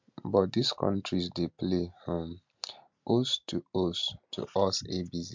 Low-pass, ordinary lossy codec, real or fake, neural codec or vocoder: 7.2 kHz; MP3, 64 kbps; real; none